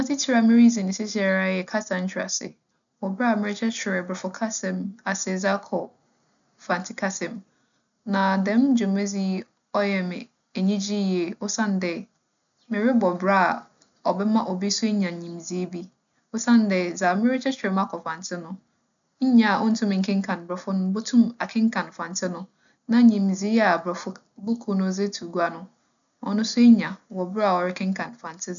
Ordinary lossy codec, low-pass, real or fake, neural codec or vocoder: none; 7.2 kHz; real; none